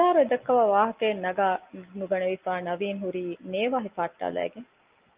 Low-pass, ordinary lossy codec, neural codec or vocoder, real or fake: 3.6 kHz; Opus, 24 kbps; none; real